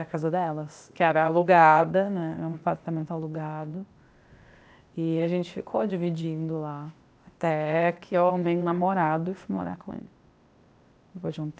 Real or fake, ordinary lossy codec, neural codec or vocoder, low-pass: fake; none; codec, 16 kHz, 0.8 kbps, ZipCodec; none